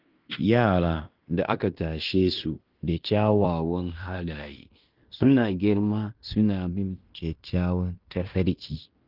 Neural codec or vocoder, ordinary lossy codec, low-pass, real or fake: codec, 16 kHz in and 24 kHz out, 0.9 kbps, LongCat-Audio-Codec, fine tuned four codebook decoder; Opus, 32 kbps; 5.4 kHz; fake